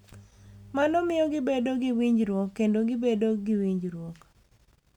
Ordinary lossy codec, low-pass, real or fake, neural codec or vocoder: none; 19.8 kHz; real; none